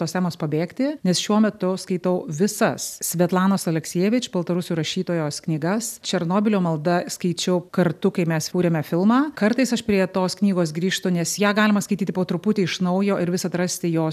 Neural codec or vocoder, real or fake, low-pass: none; real; 14.4 kHz